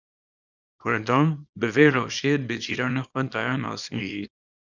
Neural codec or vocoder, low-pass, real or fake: codec, 24 kHz, 0.9 kbps, WavTokenizer, small release; 7.2 kHz; fake